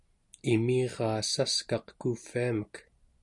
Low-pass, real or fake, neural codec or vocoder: 10.8 kHz; real; none